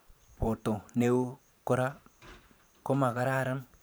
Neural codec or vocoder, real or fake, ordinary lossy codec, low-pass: vocoder, 44.1 kHz, 128 mel bands every 512 samples, BigVGAN v2; fake; none; none